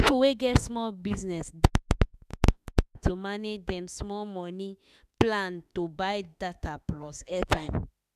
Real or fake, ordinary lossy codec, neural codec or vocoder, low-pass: fake; none; autoencoder, 48 kHz, 32 numbers a frame, DAC-VAE, trained on Japanese speech; 14.4 kHz